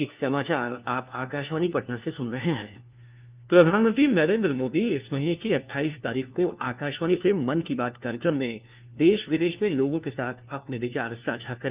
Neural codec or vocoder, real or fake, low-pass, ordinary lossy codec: codec, 16 kHz, 1 kbps, FunCodec, trained on LibriTTS, 50 frames a second; fake; 3.6 kHz; Opus, 24 kbps